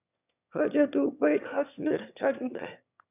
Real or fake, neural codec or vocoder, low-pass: fake; autoencoder, 22.05 kHz, a latent of 192 numbers a frame, VITS, trained on one speaker; 3.6 kHz